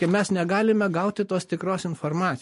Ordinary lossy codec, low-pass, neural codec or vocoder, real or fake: MP3, 48 kbps; 14.4 kHz; none; real